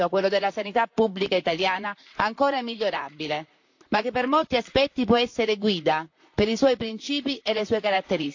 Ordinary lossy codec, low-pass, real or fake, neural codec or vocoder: AAC, 48 kbps; 7.2 kHz; fake; vocoder, 44.1 kHz, 128 mel bands, Pupu-Vocoder